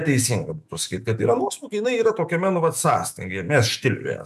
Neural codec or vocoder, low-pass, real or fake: autoencoder, 48 kHz, 128 numbers a frame, DAC-VAE, trained on Japanese speech; 14.4 kHz; fake